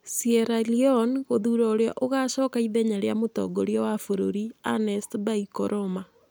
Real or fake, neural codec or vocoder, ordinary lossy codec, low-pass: real; none; none; none